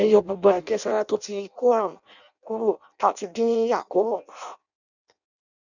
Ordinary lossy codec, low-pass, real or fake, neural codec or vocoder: none; 7.2 kHz; fake; codec, 16 kHz in and 24 kHz out, 0.6 kbps, FireRedTTS-2 codec